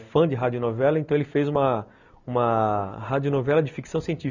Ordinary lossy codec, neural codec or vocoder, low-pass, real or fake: none; none; 7.2 kHz; real